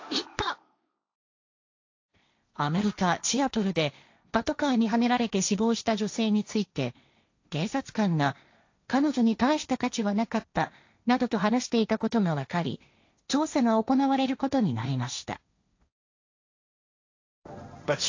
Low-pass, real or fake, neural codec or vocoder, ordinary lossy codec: 7.2 kHz; fake; codec, 16 kHz, 1.1 kbps, Voila-Tokenizer; AAC, 48 kbps